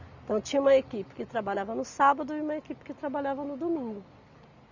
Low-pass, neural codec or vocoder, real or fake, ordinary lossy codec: 7.2 kHz; none; real; none